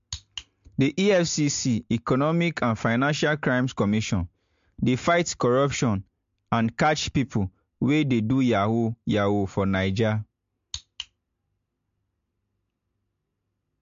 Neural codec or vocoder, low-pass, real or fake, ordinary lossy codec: none; 7.2 kHz; real; MP3, 48 kbps